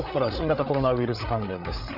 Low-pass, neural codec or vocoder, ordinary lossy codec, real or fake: 5.4 kHz; codec, 16 kHz, 8 kbps, FreqCodec, larger model; none; fake